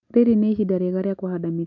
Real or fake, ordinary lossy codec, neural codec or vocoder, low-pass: real; none; none; 7.2 kHz